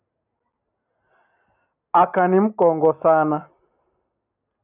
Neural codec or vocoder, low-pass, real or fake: none; 3.6 kHz; real